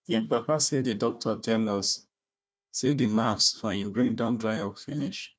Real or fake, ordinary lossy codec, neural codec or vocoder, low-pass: fake; none; codec, 16 kHz, 1 kbps, FunCodec, trained on Chinese and English, 50 frames a second; none